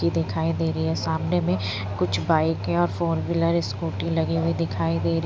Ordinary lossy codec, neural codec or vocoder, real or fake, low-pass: none; none; real; none